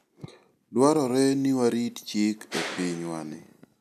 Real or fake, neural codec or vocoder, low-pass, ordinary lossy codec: real; none; 14.4 kHz; none